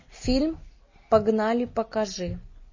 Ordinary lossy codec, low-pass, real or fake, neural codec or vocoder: MP3, 32 kbps; 7.2 kHz; real; none